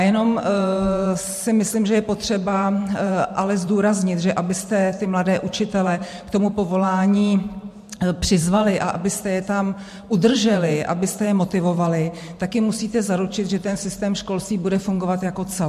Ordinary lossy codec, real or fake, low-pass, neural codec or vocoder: MP3, 64 kbps; fake; 14.4 kHz; vocoder, 44.1 kHz, 128 mel bands every 512 samples, BigVGAN v2